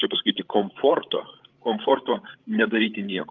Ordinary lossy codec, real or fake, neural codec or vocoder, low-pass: Opus, 32 kbps; fake; codec, 16 kHz, 16 kbps, FreqCodec, larger model; 7.2 kHz